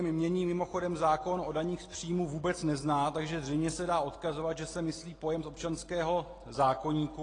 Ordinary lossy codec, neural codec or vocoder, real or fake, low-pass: AAC, 32 kbps; none; real; 9.9 kHz